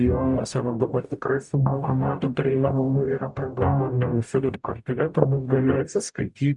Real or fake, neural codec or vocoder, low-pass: fake; codec, 44.1 kHz, 0.9 kbps, DAC; 10.8 kHz